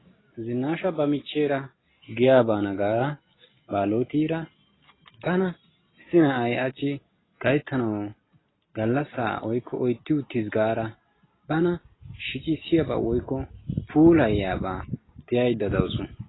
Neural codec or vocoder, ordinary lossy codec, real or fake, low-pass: none; AAC, 16 kbps; real; 7.2 kHz